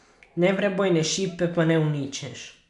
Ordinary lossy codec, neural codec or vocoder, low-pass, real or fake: MP3, 96 kbps; none; 10.8 kHz; real